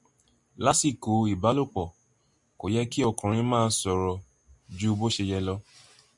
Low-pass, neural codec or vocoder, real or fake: 10.8 kHz; none; real